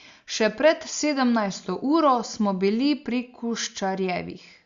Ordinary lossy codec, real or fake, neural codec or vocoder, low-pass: Opus, 64 kbps; real; none; 7.2 kHz